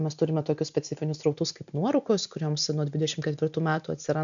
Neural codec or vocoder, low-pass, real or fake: none; 7.2 kHz; real